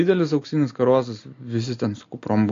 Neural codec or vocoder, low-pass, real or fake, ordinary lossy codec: none; 7.2 kHz; real; AAC, 48 kbps